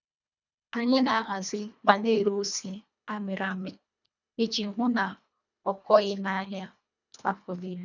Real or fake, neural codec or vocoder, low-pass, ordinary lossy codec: fake; codec, 24 kHz, 1.5 kbps, HILCodec; 7.2 kHz; none